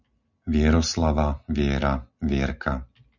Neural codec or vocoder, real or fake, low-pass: none; real; 7.2 kHz